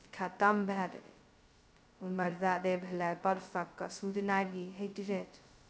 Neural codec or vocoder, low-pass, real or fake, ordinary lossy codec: codec, 16 kHz, 0.2 kbps, FocalCodec; none; fake; none